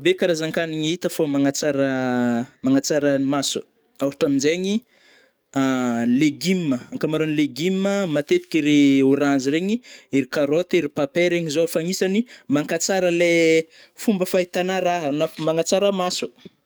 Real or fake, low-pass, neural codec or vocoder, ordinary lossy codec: fake; none; codec, 44.1 kHz, 7.8 kbps, DAC; none